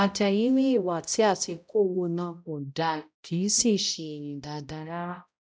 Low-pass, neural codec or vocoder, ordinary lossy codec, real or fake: none; codec, 16 kHz, 0.5 kbps, X-Codec, HuBERT features, trained on balanced general audio; none; fake